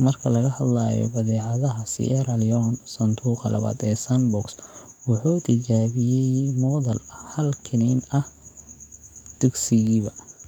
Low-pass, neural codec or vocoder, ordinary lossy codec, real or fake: 19.8 kHz; codec, 44.1 kHz, 7.8 kbps, DAC; none; fake